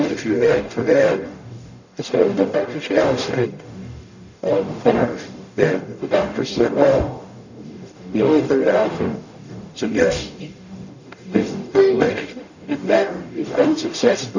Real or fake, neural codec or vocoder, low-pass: fake; codec, 44.1 kHz, 0.9 kbps, DAC; 7.2 kHz